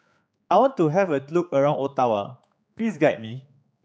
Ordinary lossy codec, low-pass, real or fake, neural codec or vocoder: none; none; fake; codec, 16 kHz, 4 kbps, X-Codec, HuBERT features, trained on general audio